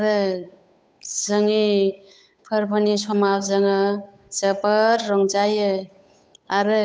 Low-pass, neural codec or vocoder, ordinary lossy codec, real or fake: none; codec, 16 kHz, 8 kbps, FunCodec, trained on Chinese and English, 25 frames a second; none; fake